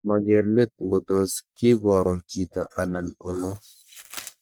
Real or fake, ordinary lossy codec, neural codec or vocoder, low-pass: fake; none; codec, 44.1 kHz, 1.7 kbps, Pupu-Codec; none